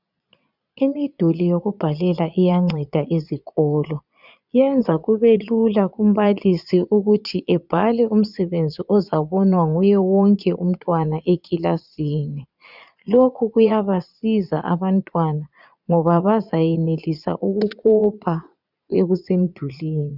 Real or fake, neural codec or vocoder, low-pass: fake; vocoder, 22.05 kHz, 80 mel bands, Vocos; 5.4 kHz